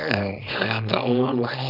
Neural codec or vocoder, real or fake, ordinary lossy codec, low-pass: codec, 24 kHz, 0.9 kbps, WavTokenizer, small release; fake; none; 5.4 kHz